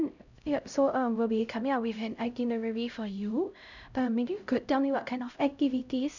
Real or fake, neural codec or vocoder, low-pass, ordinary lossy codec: fake; codec, 16 kHz, 0.5 kbps, X-Codec, HuBERT features, trained on LibriSpeech; 7.2 kHz; none